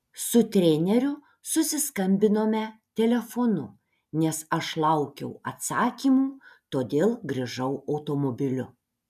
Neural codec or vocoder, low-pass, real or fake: none; 14.4 kHz; real